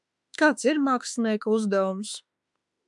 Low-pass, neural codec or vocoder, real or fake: 10.8 kHz; autoencoder, 48 kHz, 32 numbers a frame, DAC-VAE, trained on Japanese speech; fake